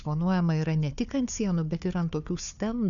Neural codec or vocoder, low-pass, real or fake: codec, 16 kHz, 4 kbps, FunCodec, trained on Chinese and English, 50 frames a second; 7.2 kHz; fake